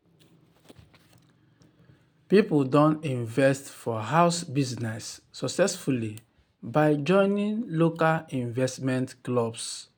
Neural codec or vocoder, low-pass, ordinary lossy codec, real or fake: none; none; none; real